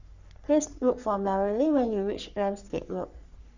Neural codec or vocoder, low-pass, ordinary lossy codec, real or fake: codec, 44.1 kHz, 3.4 kbps, Pupu-Codec; 7.2 kHz; none; fake